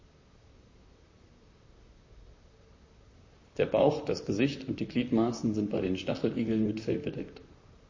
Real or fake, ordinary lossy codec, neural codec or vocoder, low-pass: fake; MP3, 32 kbps; vocoder, 44.1 kHz, 128 mel bands, Pupu-Vocoder; 7.2 kHz